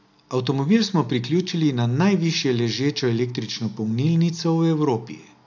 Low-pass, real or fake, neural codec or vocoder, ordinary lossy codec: 7.2 kHz; real; none; none